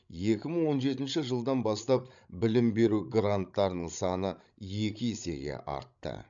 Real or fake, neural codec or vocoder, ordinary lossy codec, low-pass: fake; codec, 16 kHz, 16 kbps, FreqCodec, larger model; MP3, 96 kbps; 7.2 kHz